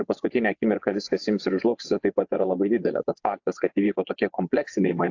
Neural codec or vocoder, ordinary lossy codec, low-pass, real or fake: vocoder, 24 kHz, 100 mel bands, Vocos; AAC, 48 kbps; 7.2 kHz; fake